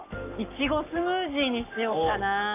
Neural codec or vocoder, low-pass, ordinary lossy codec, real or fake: codec, 44.1 kHz, 7.8 kbps, Pupu-Codec; 3.6 kHz; none; fake